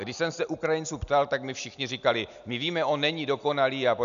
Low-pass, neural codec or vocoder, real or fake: 7.2 kHz; none; real